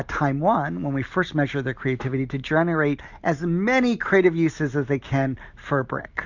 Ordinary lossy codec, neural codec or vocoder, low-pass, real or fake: Opus, 64 kbps; none; 7.2 kHz; real